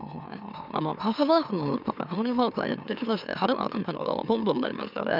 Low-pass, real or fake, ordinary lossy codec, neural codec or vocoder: 5.4 kHz; fake; none; autoencoder, 44.1 kHz, a latent of 192 numbers a frame, MeloTTS